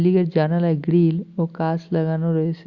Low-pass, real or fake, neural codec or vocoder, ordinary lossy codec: 7.2 kHz; real; none; Opus, 64 kbps